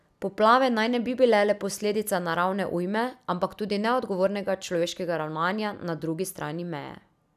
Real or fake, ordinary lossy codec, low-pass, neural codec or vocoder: real; none; 14.4 kHz; none